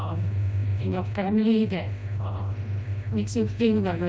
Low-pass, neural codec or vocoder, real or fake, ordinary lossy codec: none; codec, 16 kHz, 1 kbps, FreqCodec, smaller model; fake; none